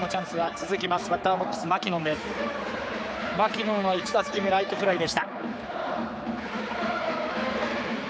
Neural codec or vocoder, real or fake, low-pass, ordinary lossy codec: codec, 16 kHz, 4 kbps, X-Codec, HuBERT features, trained on general audio; fake; none; none